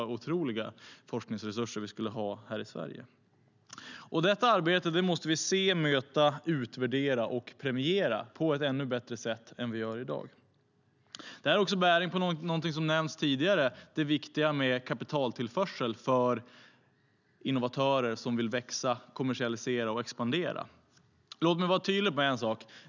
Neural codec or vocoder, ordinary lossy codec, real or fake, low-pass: none; none; real; 7.2 kHz